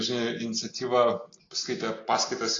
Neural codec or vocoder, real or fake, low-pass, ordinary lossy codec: none; real; 7.2 kHz; AAC, 32 kbps